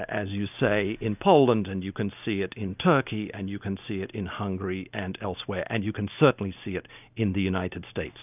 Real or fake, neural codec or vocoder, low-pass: real; none; 3.6 kHz